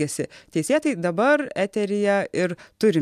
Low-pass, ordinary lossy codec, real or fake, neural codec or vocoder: 14.4 kHz; AAC, 96 kbps; real; none